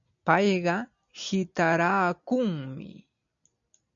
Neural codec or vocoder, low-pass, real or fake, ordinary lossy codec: none; 7.2 kHz; real; MP3, 96 kbps